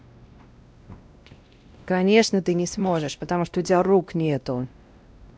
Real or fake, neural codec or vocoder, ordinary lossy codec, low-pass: fake; codec, 16 kHz, 1 kbps, X-Codec, WavLM features, trained on Multilingual LibriSpeech; none; none